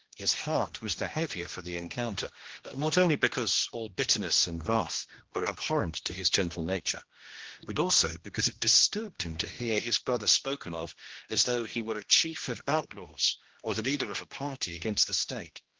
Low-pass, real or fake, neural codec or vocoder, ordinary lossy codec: 7.2 kHz; fake; codec, 16 kHz, 1 kbps, X-Codec, HuBERT features, trained on general audio; Opus, 16 kbps